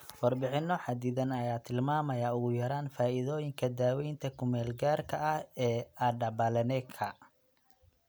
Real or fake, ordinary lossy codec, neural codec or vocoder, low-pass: real; none; none; none